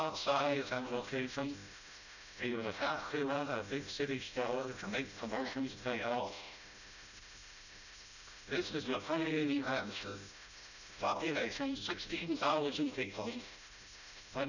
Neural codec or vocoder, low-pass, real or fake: codec, 16 kHz, 0.5 kbps, FreqCodec, smaller model; 7.2 kHz; fake